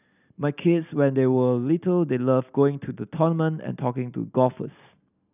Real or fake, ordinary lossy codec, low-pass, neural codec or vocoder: real; none; 3.6 kHz; none